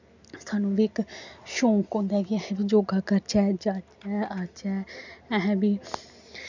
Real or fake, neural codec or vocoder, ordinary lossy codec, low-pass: real; none; none; 7.2 kHz